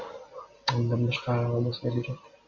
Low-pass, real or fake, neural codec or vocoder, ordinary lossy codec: 7.2 kHz; real; none; Opus, 64 kbps